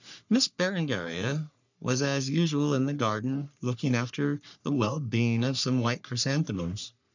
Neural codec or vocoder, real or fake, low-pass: codec, 44.1 kHz, 3.4 kbps, Pupu-Codec; fake; 7.2 kHz